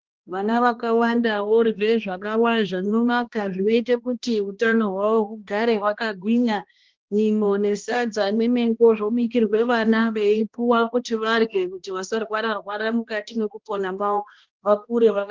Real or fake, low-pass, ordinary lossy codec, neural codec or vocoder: fake; 7.2 kHz; Opus, 16 kbps; codec, 16 kHz, 1 kbps, X-Codec, HuBERT features, trained on balanced general audio